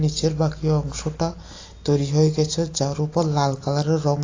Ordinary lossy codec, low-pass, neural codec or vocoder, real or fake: AAC, 32 kbps; 7.2 kHz; none; real